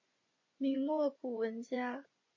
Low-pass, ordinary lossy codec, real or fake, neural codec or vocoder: 7.2 kHz; MP3, 32 kbps; fake; vocoder, 24 kHz, 100 mel bands, Vocos